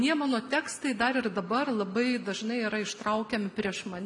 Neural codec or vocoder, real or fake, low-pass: none; real; 10.8 kHz